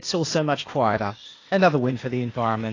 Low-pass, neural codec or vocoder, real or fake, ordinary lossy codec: 7.2 kHz; codec, 16 kHz, 0.8 kbps, ZipCodec; fake; AAC, 32 kbps